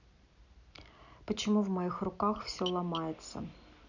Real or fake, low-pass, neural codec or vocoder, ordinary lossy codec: real; 7.2 kHz; none; none